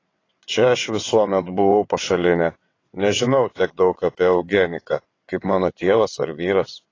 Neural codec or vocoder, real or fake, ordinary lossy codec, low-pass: vocoder, 22.05 kHz, 80 mel bands, WaveNeXt; fake; AAC, 32 kbps; 7.2 kHz